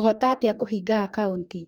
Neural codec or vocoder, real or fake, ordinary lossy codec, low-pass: codec, 44.1 kHz, 2.6 kbps, DAC; fake; none; 19.8 kHz